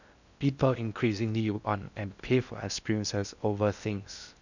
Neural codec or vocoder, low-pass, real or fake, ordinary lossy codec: codec, 16 kHz in and 24 kHz out, 0.6 kbps, FocalCodec, streaming, 2048 codes; 7.2 kHz; fake; none